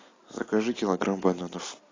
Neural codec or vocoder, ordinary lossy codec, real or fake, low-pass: none; AAC, 32 kbps; real; 7.2 kHz